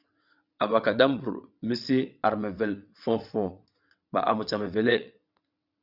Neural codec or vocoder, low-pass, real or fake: vocoder, 22.05 kHz, 80 mel bands, WaveNeXt; 5.4 kHz; fake